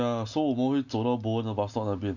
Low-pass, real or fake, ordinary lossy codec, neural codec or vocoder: 7.2 kHz; real; none; none